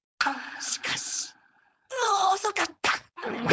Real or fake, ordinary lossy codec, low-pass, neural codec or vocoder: fake; none; none; codec, 16 kHz, 4.8 kbps, FACodec